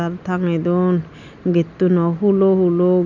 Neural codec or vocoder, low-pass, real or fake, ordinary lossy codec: none; 7.2 kHz; real; none